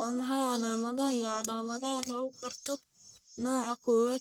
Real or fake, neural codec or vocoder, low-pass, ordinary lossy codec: fake; codec, 44.1 kHz, 1.7 kbps, Pupu-Codec; none; none